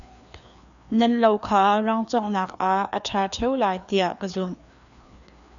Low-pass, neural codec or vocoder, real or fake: 7.2 kHz; codec, 16 kHz, 2 kbps, FreqCodec, larger model; fake